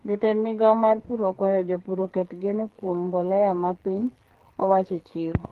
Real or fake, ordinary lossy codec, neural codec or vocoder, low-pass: fake; Opus, 16 kbps; codec, 44.1 kHz, 2.6 kbps, SNAC; 14.4 kHz